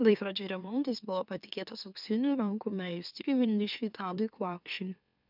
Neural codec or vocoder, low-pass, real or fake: autoencoder, 44.1 kHz, a latent of 192 numbers a frame, MeloTTS; 5.4 kHz; fake